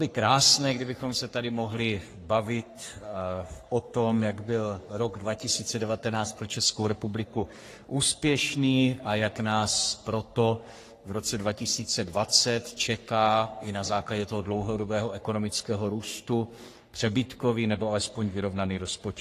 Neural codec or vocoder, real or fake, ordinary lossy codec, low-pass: codec, 44.1 kHz, 3.4 kbps, Pupu-Codec; fake; AAC, 48 kbps; 14.4 kHz